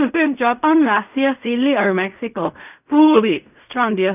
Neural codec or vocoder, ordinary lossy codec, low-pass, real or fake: codec, 16 kHz in and 24 kHz out, 0.4 kbps, LongCat-Audio-Codec, fine tuned four codebook decoder; none; 3.6 kHz; fake